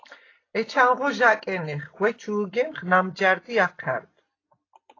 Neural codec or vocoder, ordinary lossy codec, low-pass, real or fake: none; AAC, 32 kbps; 7.2 kHz; real